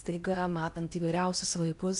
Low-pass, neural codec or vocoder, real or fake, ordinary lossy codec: 10.8 kHz; codec, 16 kHz in and 24 kHz out, 0.8 kbps, FocalCodec, streaming, 65536 codes; fake; MP3, 96 kbps